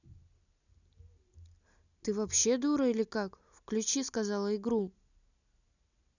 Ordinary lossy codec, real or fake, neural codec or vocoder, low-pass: none; real; none; 7.2 kHz